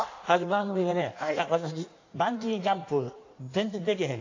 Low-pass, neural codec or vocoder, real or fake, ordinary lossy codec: 7.2 kHz; codec, 16 kHz in and 24 kHz out, 1.1 kbps, FireRedTTS-2 codec; fake; AAC, 48 kbps